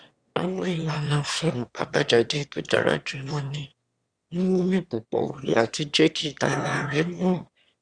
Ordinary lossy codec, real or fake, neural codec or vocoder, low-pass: Opus, 64 kbps; fake; autoencoder, 22.05 kHz, a latent of 192 numbers a frame, VITS, trained on one speaker; 9.9 kHz